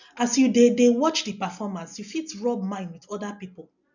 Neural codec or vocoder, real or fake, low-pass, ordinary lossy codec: none; real; 7.2 kHz; none